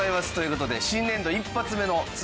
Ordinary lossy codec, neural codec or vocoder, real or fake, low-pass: none; none; real; none